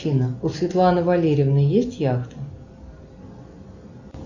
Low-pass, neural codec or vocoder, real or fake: 7.2 kHz; none; real